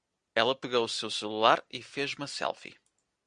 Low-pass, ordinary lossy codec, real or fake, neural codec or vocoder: 9.9 kHz; Opus, 64 kbps; real; none